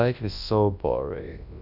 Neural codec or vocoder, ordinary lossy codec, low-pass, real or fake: codec, 24 kHz, 0.9 kbps, WavTokenizer, large speech release; none; 5.4 kHz; fake